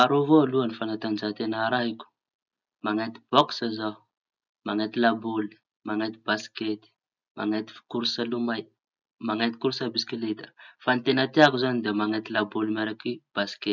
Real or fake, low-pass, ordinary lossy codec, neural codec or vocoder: real; 7.2 kHz; none; none